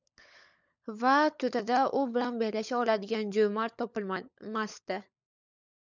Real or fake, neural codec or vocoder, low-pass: fake; codec, 16 kHz, 16 kbps, FunCodec, trained on LibriTTS, 50 frames a second; 7.2 kHz